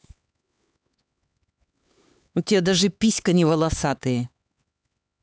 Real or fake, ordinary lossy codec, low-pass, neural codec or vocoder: fake; none; none; codec, 16 kHz, 4 kbps, X-Codec, HuBERT features, trained on LibriSpeech